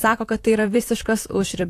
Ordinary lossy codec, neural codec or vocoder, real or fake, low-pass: AAC, 64 kbps; vocoder, 44.1 kHz, 128 mel bands, Pupu-Vocoder; fake; 14.4 kHz